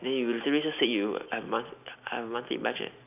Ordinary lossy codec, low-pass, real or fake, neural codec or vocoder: none; 3.6 kHz; real; none